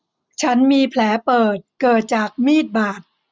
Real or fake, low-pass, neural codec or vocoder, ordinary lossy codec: real; none; none; none